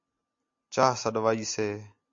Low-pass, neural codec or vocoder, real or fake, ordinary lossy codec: 7.2 kHz; none; real; MP3, 48 kbps